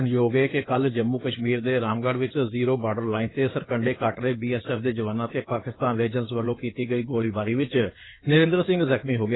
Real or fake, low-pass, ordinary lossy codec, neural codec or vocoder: fake; 7.2 kHz; AAC, 16 kbps; codec, 16 kHz in and 24 kHz out, 2.2 kbps, FireRedTTS-2 codec